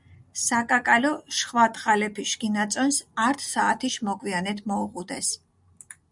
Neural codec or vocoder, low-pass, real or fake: none; 10.8 kHz; real